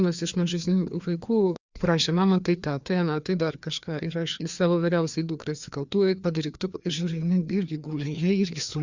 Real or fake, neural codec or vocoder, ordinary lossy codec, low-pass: fake; codec, 16 kHz, 2 kbps, FreqCodec, larger model; Opus, 64 kbps; 7.2 kHz